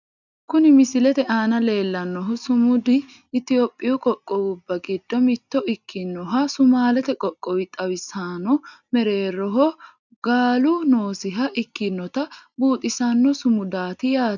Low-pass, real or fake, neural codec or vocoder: 7.2 kHz; real; none